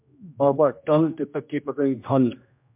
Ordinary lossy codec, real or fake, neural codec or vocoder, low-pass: MP3, 32 kbps; fake; codec, 16 kHz, 1 kbps, X-Codec, HuBERT features, trained on general audio; 3.6 kHz